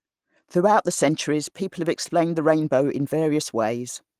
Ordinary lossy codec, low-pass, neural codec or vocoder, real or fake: Opus, 24 kbps; 14.4 kHz; none; real